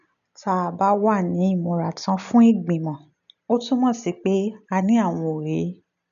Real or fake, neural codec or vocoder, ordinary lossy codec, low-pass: real; none; none; 7.2 kHz